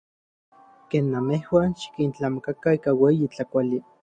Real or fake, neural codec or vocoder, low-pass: real; none; 9.9 kHz